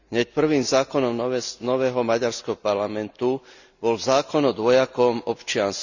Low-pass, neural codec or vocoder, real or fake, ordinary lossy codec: 7.2 kHz; none; real; none